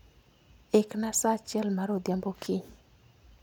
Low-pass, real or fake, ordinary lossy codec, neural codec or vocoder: none; real; none; none